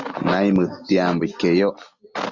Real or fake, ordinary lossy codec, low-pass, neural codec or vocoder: real; Opus, 64 kbps; 7.2 kHz; none